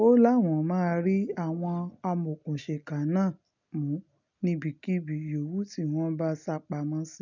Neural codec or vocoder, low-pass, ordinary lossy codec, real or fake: none; 7.2 kHz; none; real